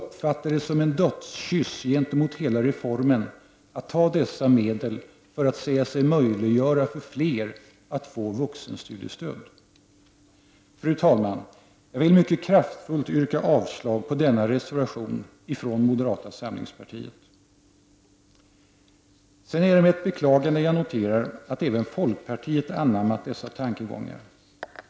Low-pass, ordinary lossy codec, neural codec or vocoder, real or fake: none; none; none; real